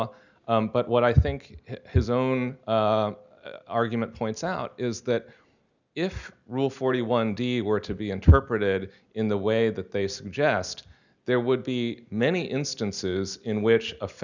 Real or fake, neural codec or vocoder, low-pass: real; none; 7.2 kHz